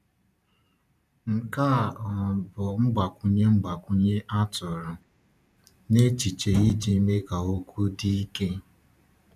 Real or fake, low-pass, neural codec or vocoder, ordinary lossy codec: fake; 14.4 kHz; vocoder, 44.1 kHz, 128 mel bands every 512 samples, BigVGAN v2; none